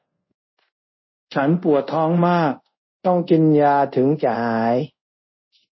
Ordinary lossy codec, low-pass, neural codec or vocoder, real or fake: MP3, 24 kbps; 7.2 kHz; codec, 24 kHz, 0.5 kbps, DualCodec; fake